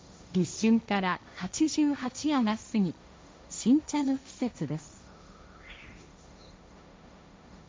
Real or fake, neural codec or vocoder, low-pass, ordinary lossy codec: fake; codec, 16 kHz, 1.1 kbps, Voila-Tokenizer; none; none